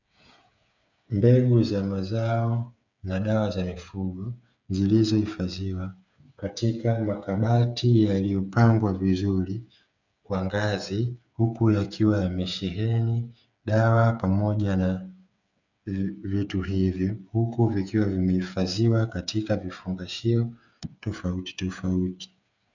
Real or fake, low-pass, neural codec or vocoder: fake; 7.2 kHz; codec, 16 kHz, 8 kbps, FreqCodec, smaller model